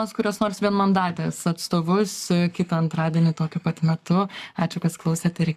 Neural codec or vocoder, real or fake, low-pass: codec, 44.1 kHz, 7.8 kbps, Pupu-Codec; fake; 14.4 kHz